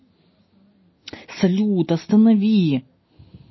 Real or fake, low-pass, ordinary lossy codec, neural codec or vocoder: real; 7.2 kHz; MP3, 24 kbps; none